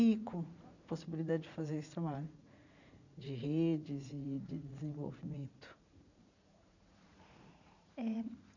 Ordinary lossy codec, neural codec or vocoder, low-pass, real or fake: none; vocoder, 44.1 kHz, 80 mel bands, Vocos; 7.2 kHz; fake